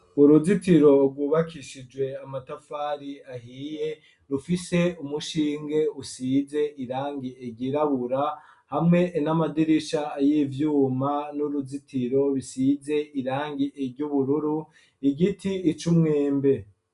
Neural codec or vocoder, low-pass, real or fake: none; 10.8 kHz; real